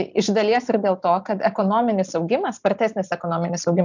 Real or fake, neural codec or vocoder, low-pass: real; none; 7.2 kHz